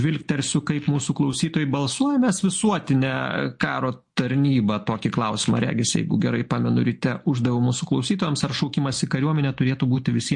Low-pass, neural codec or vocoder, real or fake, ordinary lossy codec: 10.8 kHz; none; real; MP3, 48 kbps